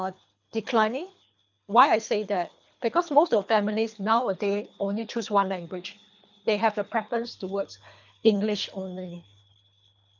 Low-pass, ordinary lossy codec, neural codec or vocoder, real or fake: 7.2 kHz; none; codec, 24 kHz, 3 kbps, HILCodec; fake